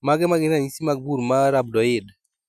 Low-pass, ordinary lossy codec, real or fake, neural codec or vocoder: 14.4 kHz; none; real; none